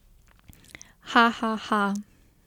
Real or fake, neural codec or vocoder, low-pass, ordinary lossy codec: real; none; 19.8 kHz; MP3, 96 kbps